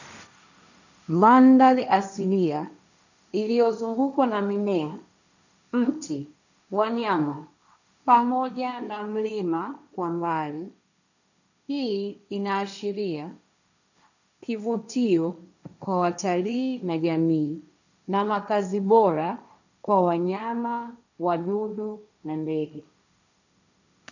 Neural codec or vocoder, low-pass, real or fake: codec, 16 kHz, 1.1 kbps, Voila-Tokenizer; 7.2 kHz; fake